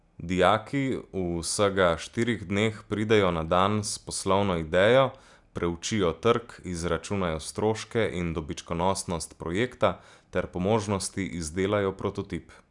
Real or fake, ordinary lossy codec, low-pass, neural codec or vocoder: real; none; 10.8 kHz; none